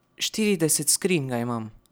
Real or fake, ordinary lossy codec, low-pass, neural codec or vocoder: real; none; none; none